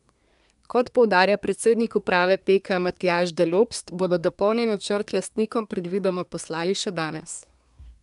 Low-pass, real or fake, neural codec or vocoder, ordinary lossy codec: 10.8 kHz; fake; codec, 24 kHz, 1 kbps, SNAC; none